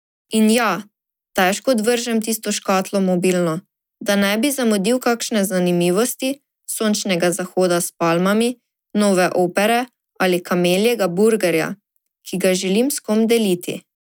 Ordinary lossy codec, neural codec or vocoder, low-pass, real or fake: none; none; none; real